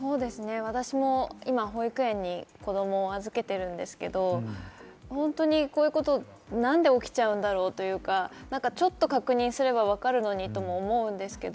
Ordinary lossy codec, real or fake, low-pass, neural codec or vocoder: none; real; none; none